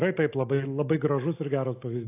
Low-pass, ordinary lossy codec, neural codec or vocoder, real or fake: 3.6 kHz; AAC, 24 kbps; none; real